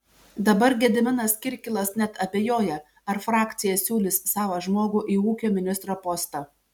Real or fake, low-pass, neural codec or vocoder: real; 19.8 kHz; none